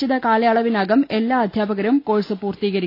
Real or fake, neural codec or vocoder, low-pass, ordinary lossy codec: real; none; 5.4 kHz; AAC, 32 kbps